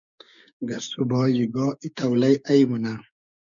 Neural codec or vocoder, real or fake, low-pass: codec, 16 kHz, 6 kbps, DAC; fake; 7.2 kHz